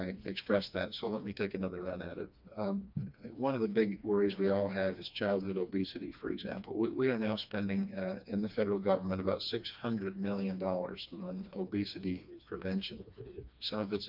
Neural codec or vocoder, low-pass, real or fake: codec, 16 kHz, 2 kbps, FreqCodec, smaller model; 5.4 kHz; fake